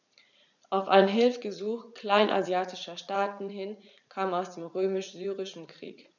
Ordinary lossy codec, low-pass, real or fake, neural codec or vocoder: none; 7.2 kHz; fake; vocoder, 44.1 kHz, 80 mel bands, Vocos